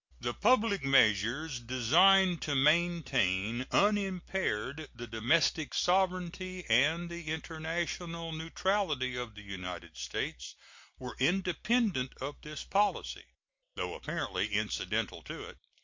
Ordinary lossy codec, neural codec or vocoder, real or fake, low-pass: MP3, 48 kbps; none; real; 7.2 kHz